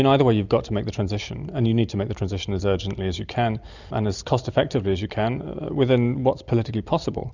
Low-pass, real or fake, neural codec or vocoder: 7.2 kHz; real; none